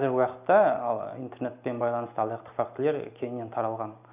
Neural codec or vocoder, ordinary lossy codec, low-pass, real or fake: none; none; 3.6 kHz; real